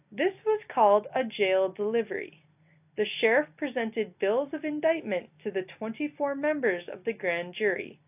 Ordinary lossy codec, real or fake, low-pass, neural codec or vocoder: AAC, 32 kbps; real; 3.6 kHz; none